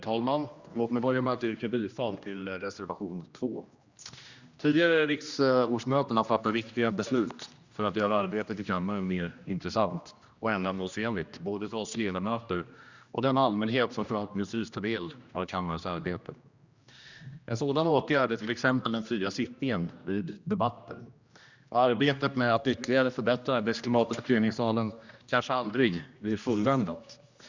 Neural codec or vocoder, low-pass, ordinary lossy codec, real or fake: codec, 16 kHz, 1 kbps, X-Codec, HuBERT features, trained on general audio; 7.2 kHz; Opus, 64 kbps; fake